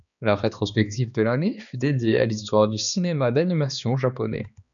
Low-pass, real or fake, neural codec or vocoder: 7.2 kHz; fake; codec, 16 kHz, 2 kbps, X-Codec, HuBERT features, trained on balanced general audio